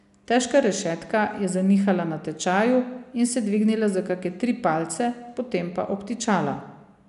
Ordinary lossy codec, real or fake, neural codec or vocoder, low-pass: none; real; none; 10.8 kHz